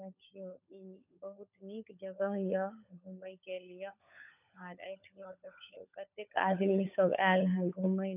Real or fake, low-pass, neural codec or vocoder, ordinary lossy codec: fake; 3.6 kHz; codec, 16 kHz, 16 kbps, FunCodec, trained on LibriTTS, 50 frames a second; none